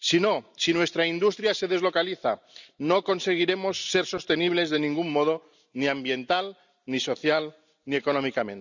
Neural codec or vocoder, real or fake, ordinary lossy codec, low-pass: none; real; none; 7.2 kHz